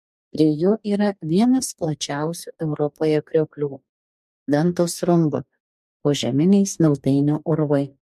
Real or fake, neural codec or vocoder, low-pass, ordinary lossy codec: fake; codec, 44.1 kHz, 2.6 kbps, DAC; 14.4 kHz; MP3, 64 kbps